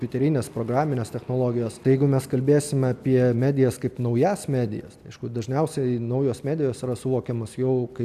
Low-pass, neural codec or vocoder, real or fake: 14.4 kHz; none; real